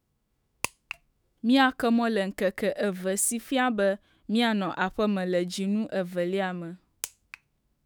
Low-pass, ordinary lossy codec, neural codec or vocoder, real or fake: none; none; autoencoder, 48 kHz, 128 numbers a frame, DAC-VAE, trained on Japanese speech; fake